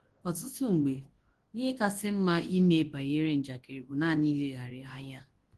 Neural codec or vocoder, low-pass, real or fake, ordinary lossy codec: codec, 24 kHz, 0.9 kbps, WavTokenizer, large speech release; 10.8 kHz; fake; Opus, 16 kbps